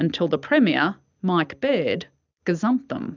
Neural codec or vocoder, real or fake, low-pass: none; real; 7.2 kHz